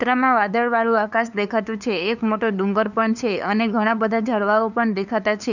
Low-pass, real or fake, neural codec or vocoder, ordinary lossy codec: 7.2 kHz; fake; codec, 16 kHz, 2 kbps, FunCodec, trained on LibriTTS, 25 frames a second; none